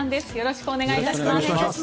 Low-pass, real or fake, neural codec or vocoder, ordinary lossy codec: none; real; none; none